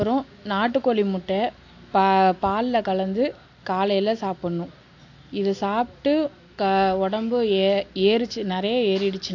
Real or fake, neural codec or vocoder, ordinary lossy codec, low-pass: real; none; AAC, 48 kbps; 7.2 kHz